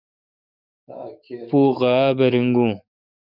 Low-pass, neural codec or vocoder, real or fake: 5.4 kHz; codec, 44.1 kHz, 7.8 kbps, DAC; fake